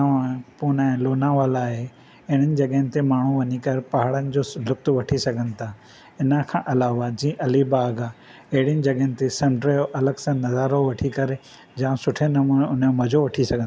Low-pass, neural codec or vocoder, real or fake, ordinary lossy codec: none; none; real; none